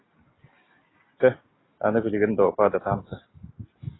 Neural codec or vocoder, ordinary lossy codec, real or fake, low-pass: none; AAC, 16 kbps; real; 7.2 kHz